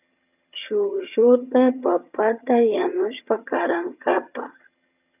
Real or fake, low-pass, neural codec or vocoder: fake; 3.6 kHz; vocoder, 22.05 kHz, 80 mel bands, HiFi-GAN